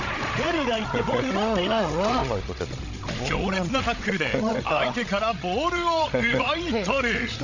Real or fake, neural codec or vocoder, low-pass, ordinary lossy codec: fake; codec, 16 kHz, 16 kbps, FreqCodec, larger model; 7.2 kHz; none